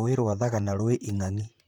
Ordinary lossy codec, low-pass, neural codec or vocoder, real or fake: none; none; vocoder, 44.1 kHz, 128 mel bands, Pupu-Vocoder; fake